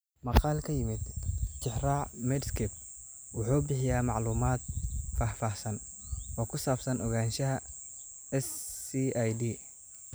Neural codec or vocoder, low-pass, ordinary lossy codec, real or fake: none; none; none; real